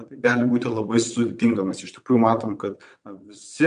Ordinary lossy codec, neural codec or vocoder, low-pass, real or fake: MP3, 64 kbps; vocoder, 22.05 kHz, 80 mel bands, WaveNeXt; 9.9 kHz; fake